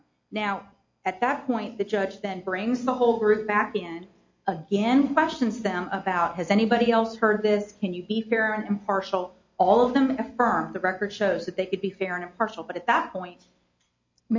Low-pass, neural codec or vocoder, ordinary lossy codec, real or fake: 7.2 kHz; none; MP3, 48 kbps; real